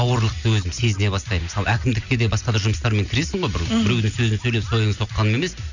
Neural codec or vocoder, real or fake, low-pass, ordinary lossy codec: none; real; 7.2 kHz; none